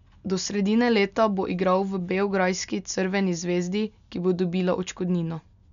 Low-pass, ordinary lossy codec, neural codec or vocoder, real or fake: 7.2 kHz; none; none; real